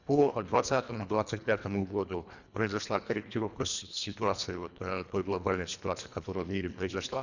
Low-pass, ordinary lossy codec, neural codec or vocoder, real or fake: 7.2 kHz; none; codec, 24 kHz, 1.5 kbps, HILCodec; fake